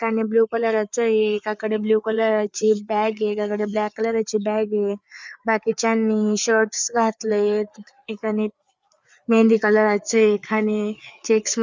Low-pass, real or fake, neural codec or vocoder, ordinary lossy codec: none; fake; codec, 16 kHz, 4 kbps, FreqCodec, larger model; none